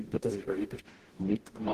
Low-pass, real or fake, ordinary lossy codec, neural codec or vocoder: 14.4 kHz; fake; Opus, 16 kbps; codec, 44.1 kHz, 0.9 kbps, DAC